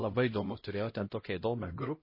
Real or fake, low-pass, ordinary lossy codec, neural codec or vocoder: fake; 5.4 kHz; MP3, 24 kbps; codec, 16 kHz, 0.5 kbps, X-Codec, HuBERT features, trained on LibriSpeech